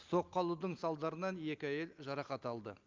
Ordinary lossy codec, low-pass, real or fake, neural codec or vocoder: Opus, 24 kbps; 7.2 kHz; real; none